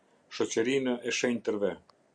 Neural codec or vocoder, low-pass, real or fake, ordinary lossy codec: none; 9.9 kHz; real; Opus, 64 kbps